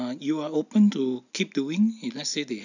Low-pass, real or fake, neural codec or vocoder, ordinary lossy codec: 7.2 kHz; real; none; none